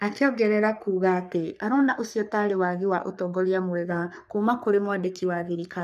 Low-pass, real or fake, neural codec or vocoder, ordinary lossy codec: 14.4 kHz; fake; codec, 44.1 kHz, 3.4 kbps, Pupu-Codec; none